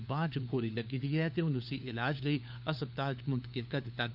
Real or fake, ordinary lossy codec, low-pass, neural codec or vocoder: fake; none; 5.4 kHz; codec, 16 kHz, 4 kbps, FunCodec, trained on LibriTTS, 50 frames a second